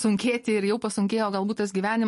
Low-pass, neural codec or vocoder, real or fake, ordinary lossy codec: 14.4 kHz; none; real; MP3, 48 kbps